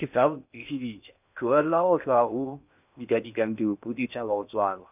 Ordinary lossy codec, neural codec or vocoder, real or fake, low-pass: none; codec, 16 kHz in and 24 kHz out, 0.6 kbps, FocalCodec, streaming, 4096 codes; fake; 3.6 kHz